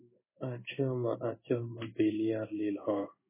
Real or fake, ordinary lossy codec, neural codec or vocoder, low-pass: fake; MP3, 16 kbps; codec, 16 kHz in and 24 kHz out, 1 kbps, XY-Tokenizer; 3.6 kHz